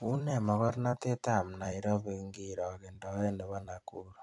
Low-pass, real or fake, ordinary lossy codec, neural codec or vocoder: 10.8 kHz; fake; AAC, 64 kbps; vocoder, 44.1 kHz, 128 mel bands every 256 samples, BigVGAN v2